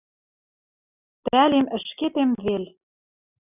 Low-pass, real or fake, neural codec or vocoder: 3.6 kHz; real; none